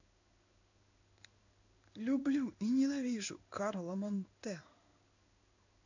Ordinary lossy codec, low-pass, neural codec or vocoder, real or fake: none; 7.2 kHz; codec, 16 kHz in and 24 kHz out, 1 kbps, XY-Tokenizer; fake